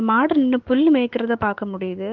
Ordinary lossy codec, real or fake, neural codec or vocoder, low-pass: Opus, 16 kbps; fake; autoencoder, 48 kHz, 128 numbers a frame, DAC-VAE, trained on Japanese speech; 7.2 kHz